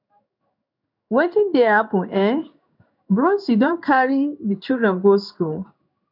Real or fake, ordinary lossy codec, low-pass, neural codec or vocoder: fake; none; 5.4 kHz; codec, 16 kHz in and 24 kHz out, 1 kbps, XY-Tokenizer